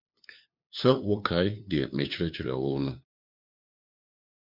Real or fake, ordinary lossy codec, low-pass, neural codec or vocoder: fake; MP3, 48 kbps; 5.4 kHz; codec, 16 kHz, 2 kbps, FunCodec, trained on LibriTTS, 25 frames a second